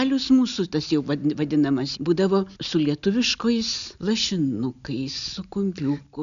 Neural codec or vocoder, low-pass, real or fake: none; 7.2 kHz; real